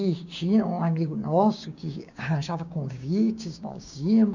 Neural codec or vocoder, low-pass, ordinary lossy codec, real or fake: codec, 16 kHz, 6 kbps, DAC; 7.2 kHz; none; fake